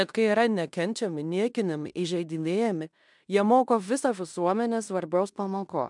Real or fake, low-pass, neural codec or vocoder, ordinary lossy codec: fake; 10.8 kHz; codec, 16 kHz in and 24 kHz out, 0.9 kbps, LongCat-Audio-Codec, fine tuned four codebook decoder; MP3, 96 kbps